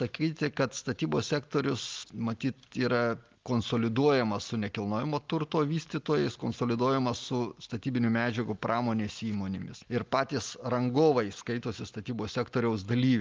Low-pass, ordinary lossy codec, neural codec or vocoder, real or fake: 7.2 kHz; Opus, 24 kbps; none; real